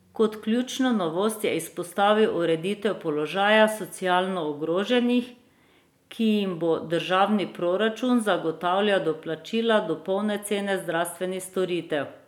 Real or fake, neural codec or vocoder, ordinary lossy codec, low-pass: real; none; none; 19.8 kHz